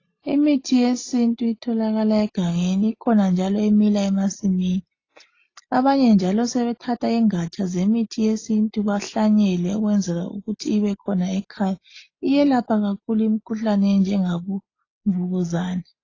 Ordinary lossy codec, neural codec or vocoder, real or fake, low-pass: AAC, 32 kbps; none; real; 7.2 kHz